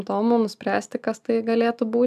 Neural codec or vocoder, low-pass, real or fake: none; 14.4 kHz; real